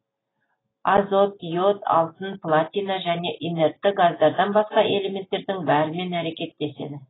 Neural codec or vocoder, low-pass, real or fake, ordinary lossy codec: none; 7.2 kHz; real; AAC, 16 kbps